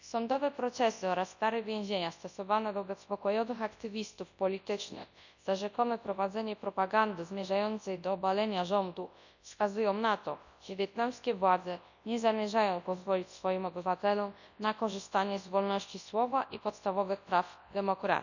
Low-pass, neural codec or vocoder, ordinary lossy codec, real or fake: 7.2 kHz; codec, 24 kHz, 0.9 kbps, WavTokenizer, large speech release; none; fake